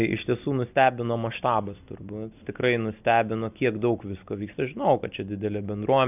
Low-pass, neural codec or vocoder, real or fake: 3.6 kHz; none; real